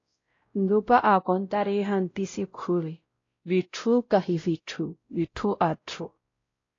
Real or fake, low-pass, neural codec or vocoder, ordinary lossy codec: fake; 7.2 kHz; codec, 16 kHz, 0.5 kbps, X-Codec, WavLM features, trained on Multilingual LibriSpeech; AAC, 32 kbps